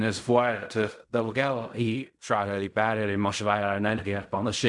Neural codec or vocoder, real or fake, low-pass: codec, 16 kHz in and 24 kHz out, 0.4 kbps, LongCat-Audio-Codec, fine tuned four codebook decoder; fake; 10.8 kHz